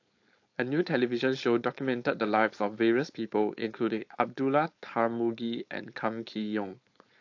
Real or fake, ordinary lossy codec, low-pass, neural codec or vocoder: fake; AAC, 48 kbps; 7.2 kHz; codec, 16 kHz, 4.8 kbps, FACodec